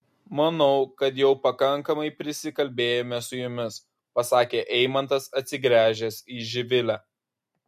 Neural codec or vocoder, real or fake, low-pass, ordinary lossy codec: none; real; 14.4 kHz; MP3, 64 kbps